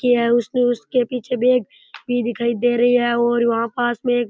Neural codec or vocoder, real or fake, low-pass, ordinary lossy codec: none; real; none; none